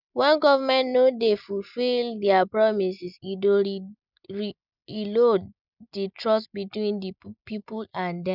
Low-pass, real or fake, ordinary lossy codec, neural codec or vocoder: 5.4 kHz; real; none; none